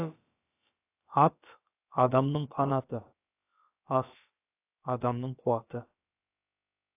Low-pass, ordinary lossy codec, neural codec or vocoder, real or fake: 3.6 kHz; AAC, 24 kbps; codec, 16 kHz, about 1 kbps, DyCAST, with the encoder's durations; fake